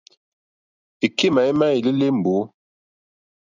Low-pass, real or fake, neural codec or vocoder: 7.2 kHz; real; none